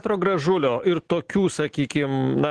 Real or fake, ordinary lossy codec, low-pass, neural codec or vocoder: real; Opus, 16 kbps; 9.9 kHz; none